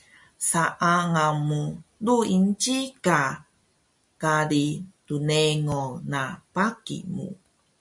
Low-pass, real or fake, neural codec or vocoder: 10.8 kHz; real; none